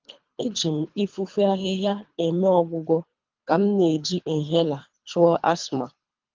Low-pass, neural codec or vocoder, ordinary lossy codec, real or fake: 7.2 kHz; codec, 24 kHz, 3 kbps, HILCodec; Opus, 32 kbps; fake